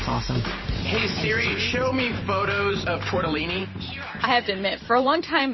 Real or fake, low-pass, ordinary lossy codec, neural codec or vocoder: fake; 7.2 kHz; MP3, 24 kbps; vocoder, 44.1 kHz, 128 mel bands every 512 samples, BigVGAN v2